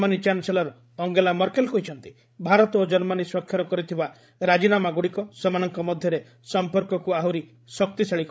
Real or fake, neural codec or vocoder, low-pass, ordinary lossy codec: fake; codec, 16 kHz, 16 kbps, FreqCodec, larger model; none; none